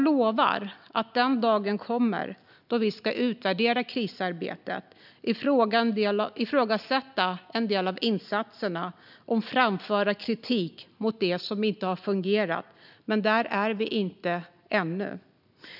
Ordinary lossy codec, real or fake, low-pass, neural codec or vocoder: none; real; 5.4 kHz; none